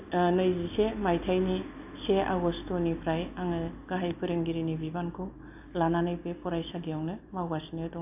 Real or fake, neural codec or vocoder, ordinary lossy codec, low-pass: real; none; AAC, 32 kbps; 3.6 kHz